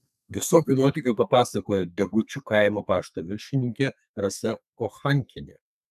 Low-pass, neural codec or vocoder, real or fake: 14.4 kHz; codec, 32 kHz, 1.9 kbps, SNAC; fake